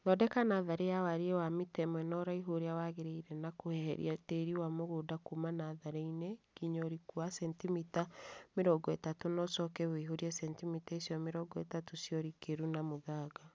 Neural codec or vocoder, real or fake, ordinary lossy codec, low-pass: none; real; none; none